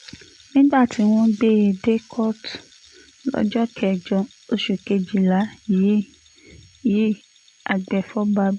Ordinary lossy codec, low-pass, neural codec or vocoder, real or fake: none; 10.8 kHz; none; real